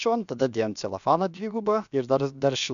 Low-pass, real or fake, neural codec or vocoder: 7.2 kHz; fake; codec, 16 kHz, about 1 kbps, DyCAST, with the encoder's durations